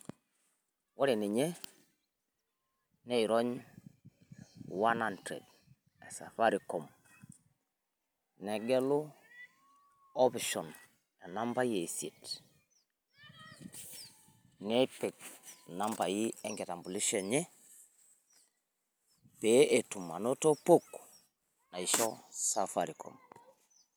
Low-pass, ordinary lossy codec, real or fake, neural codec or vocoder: none; none; real; none